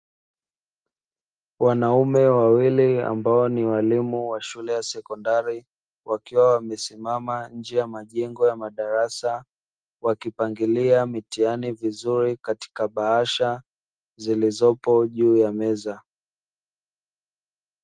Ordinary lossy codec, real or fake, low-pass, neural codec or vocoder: Opus, 24 kbps; real; 9.9 kHz; none